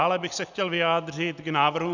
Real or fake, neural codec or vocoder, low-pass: real; none; 7.2 kHz